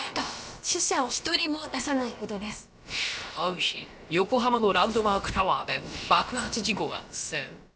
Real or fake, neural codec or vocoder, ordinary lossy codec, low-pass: fake; codec, 16 kHz, about 1 kbps, DyCAST, with the encoder's durations; none; none